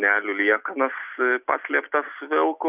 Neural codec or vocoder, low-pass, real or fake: none; 3.6 kHz; real